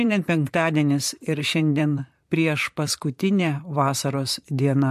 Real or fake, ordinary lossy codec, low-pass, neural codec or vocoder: fake; MP3, 64 kbps; 14.4 kHz; vocoder, 44.1 kHz, 128 mel bands, Pupu-Vocoder